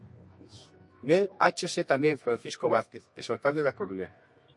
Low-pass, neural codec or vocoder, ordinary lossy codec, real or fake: 10.8 kHz; codec, 24 kHz, 0.9 kbps, WavTokenizer, medium music audio release; MP3, 48 kbps; fake